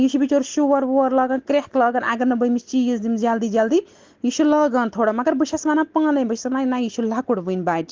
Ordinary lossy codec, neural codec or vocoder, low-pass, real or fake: Opus, 16 kbps; none; 7.2 kHz; real